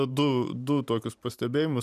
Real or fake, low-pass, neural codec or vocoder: fake; 14.4 kHz; vocoder, 44.1 kHz, 128 mel bands every 512 samples, BigVGAN v2